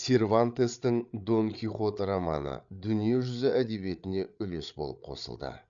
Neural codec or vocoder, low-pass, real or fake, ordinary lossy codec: codec, 16 kHz, 8 kbps, FreqCodec, larger model; 7.2 kHz; fake; none